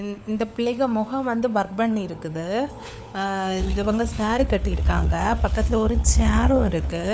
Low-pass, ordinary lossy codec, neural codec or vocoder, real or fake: none; none; codec, 16 kHz, 4 kbps, FunCodec, trained on LibriTTS, 50 frames a second; fake